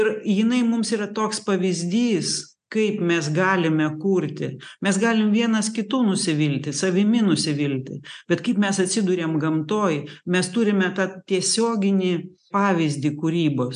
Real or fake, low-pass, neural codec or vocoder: real; 9.9 kHz; none